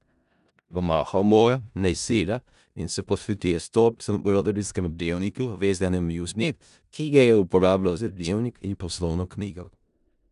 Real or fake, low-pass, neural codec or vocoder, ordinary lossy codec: fake; 10.8 kHz; codec, 16 kHz in and 24 kHz out, 0.4 kbps, LongCat-Audio-Codec, four codebook decoder; none